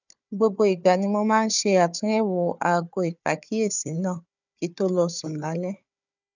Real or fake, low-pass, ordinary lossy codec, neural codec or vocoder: fake; 7.2 kHz; none; codec, 16 kHz, 4 kbps, FunCodec, trained on Chinese and English, 50 frames a second